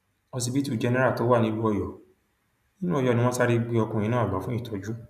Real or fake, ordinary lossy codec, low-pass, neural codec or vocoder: real; none; 14.4 kHz; none